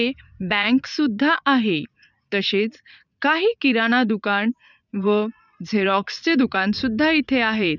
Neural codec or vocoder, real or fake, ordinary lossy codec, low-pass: vocoder, 44.1 kHz, 80 mel bands, Vocos; fake; none; 7.2 kHz